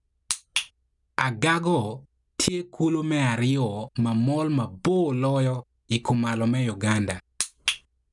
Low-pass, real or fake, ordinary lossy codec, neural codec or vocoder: 10.8 kHz; real; none; none